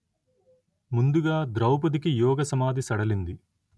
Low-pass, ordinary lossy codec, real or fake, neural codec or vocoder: none; none; real; none